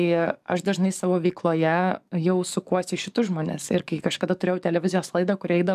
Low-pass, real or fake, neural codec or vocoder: 14.4 kHz; fake; codec, 44.1 kHz, 7.8 kbps, DAC